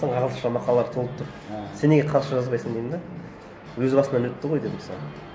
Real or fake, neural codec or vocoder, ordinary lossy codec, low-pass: real; none; none; none